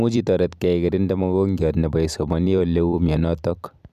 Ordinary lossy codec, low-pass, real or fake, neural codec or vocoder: none; 14.4 kHz; fake; vocoder, 44.1 kHz, 128 mel bands every 256 samples, BigVGAN v2